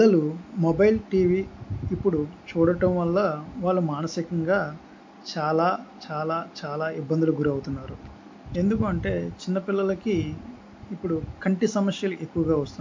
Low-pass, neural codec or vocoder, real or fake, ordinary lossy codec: 7.2 kHz; none; real; MP3, 48 kbps